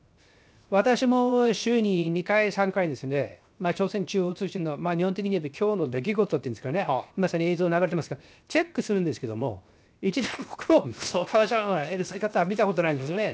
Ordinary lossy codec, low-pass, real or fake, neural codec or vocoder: none; none; fake; codec, 16 kHz, 0.7 kbps, FocalCodec